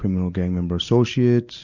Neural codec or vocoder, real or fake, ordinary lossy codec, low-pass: none; real; Opus, 64 kbps; 7.2 kHz